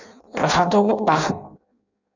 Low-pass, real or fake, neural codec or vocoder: 7.2 kHz; fake; codec, 16 kHz in and 24 kHz out, 0.6 kbps, FireRedTTS-2 codec